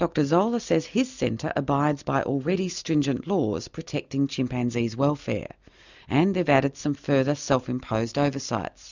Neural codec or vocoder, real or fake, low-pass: none; real; 7.2 kHz